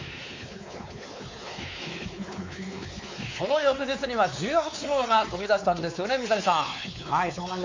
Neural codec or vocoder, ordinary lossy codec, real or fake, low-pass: codec, 16 kHz, 4 kbps, X-Codec, WavLM features, trained on Multilingual LibriSpeech; MP3, 48 kbps; fake; 7.2 kHz